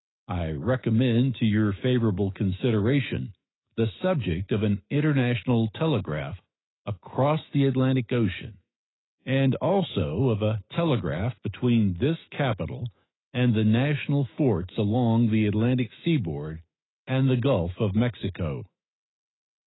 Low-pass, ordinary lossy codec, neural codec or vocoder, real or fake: 7.2 kHz; AAC, 16 kbps; autoencoder, 48 kHz, 128 numbers a frame, DAC-VAE, trained on Japanese speech; fake